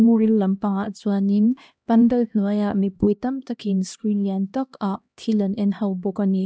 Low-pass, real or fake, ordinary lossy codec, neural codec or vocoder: none; fake; none; codec, 16 kHz, 1 kbps, X-Codec, HuBERT features, trained on LibriSpeech